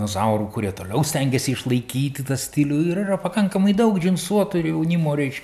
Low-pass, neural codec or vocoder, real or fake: 14.4 kHz; none; real